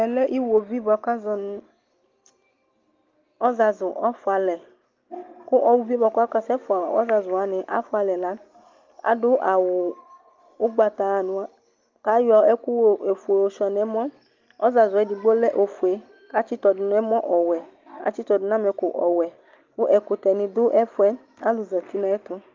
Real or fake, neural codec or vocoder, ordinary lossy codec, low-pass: real; none; Opus, 24 kbps; 7.2 kHz